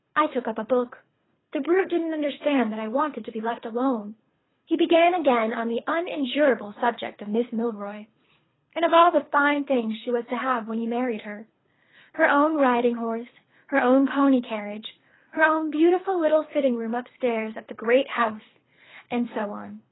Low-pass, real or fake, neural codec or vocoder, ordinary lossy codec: 7.2 kHz; fake; codec, 24 kHz, 3 kbps, HILCodec; AAC, 16 kbps